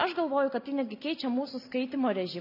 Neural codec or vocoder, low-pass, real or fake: none; 5.4 kHz; real